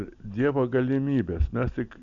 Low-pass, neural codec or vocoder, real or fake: 7.2 kHz; none; real